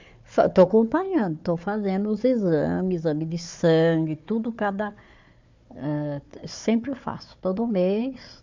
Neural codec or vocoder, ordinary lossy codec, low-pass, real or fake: codec, 16 kHz, 4 kbps, FunCodec, trained on Chinese and English, 50 frames a second; MP3, 64 kbps; 7.2 kHz; fake